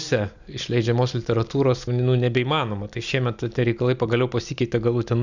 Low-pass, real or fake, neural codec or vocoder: 7.2 kHz; real; none